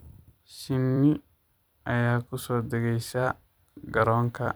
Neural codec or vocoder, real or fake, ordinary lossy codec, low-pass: vocoder, 44.1 kHz, 128 mel bands every 256 samples, BigVGAN v2; fake; none; none